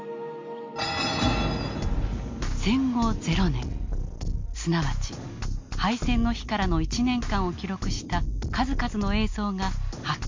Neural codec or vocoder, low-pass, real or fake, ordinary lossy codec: none; 7.2 kHz; real; MP3, 48 kbps